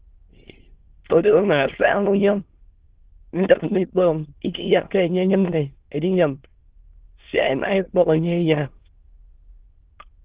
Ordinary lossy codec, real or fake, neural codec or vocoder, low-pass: Opus, 16 kbps; fake; autoencoder, 22.05 kHz, a latent of 192 numbers a frame, VITS, trained on many speakers; 3.6 kHz